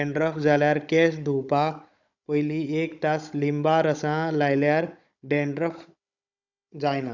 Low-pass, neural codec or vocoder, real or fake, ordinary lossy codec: 7.2 kHz; codec, 16 kHz, 16 kbps, FunCodec, trained on Chinese and English, 50 frames a second; fake; none